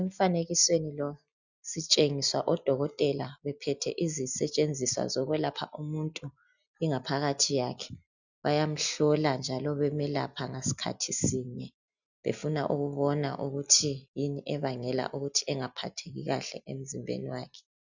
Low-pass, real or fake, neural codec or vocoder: 7.2 kHz; real; none